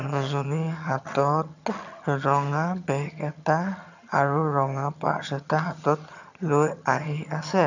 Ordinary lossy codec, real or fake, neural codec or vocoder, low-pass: none; fake; vocoder, 22.05 kHz, 80 mel bands, HiFi-GAN; 7.2 kHz